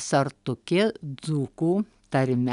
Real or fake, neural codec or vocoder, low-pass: real; none; 10.8 kHz